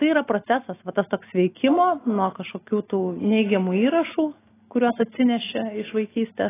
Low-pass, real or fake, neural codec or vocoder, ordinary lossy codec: 3.6 kHz; real; none; AAC, 16 kbps